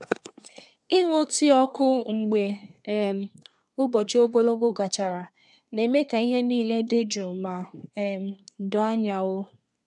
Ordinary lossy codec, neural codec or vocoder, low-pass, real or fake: none; codec, 24 kHz, 1 kbps, SNAC; 10.8 kHz; fake